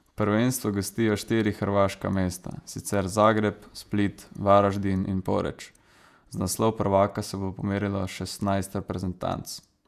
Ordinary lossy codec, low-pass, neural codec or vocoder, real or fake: none; 14.4 kHz; vocoder, 48 kHz, 128 mel bands, Vocos; fake